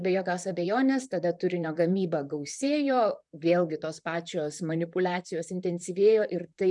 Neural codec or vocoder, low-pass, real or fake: vocoder, 44.1 kHz, 128 mel bands, Pupu-Vocoder; 10.8 kHz; fake